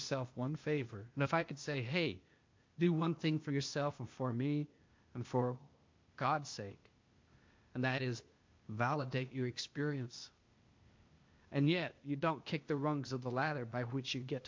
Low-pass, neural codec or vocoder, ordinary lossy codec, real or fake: 7.2 kHz; codec, 16 kHz, 0.8 kbps, ZipCodec; MP3, 48 kbps; fake